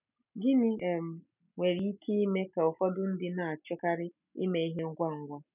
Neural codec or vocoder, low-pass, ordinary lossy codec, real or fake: none; 3.6 kHz; none; real